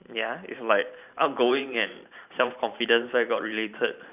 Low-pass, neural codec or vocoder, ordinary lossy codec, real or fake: 3.6 kHz; vocoder, 44.1 kHz, 128 mel bands every 512 samples, BigVGAN v2; none; fake